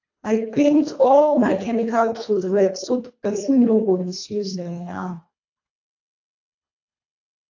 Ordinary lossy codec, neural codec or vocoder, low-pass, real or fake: AAC, 48 kbps; codec, 24 kHz, 1.5 kbps, HILCodec; 7.2 kHz; fake